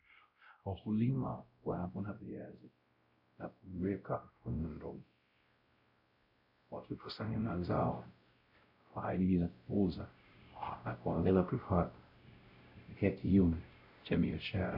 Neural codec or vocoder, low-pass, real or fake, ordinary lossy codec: codec, 16 kHz, 0.5 kbps, X-Codec, WavLM features, trained on Multilingual LibriSpeech; 5.4 kHz; fake; Opus, 64 kbps